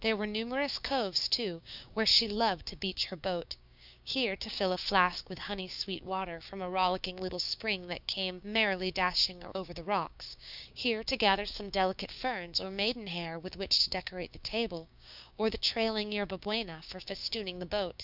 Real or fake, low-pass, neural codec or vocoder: fake; 5.4 kHz; codec, 16 kHz, 6 kbps, DAC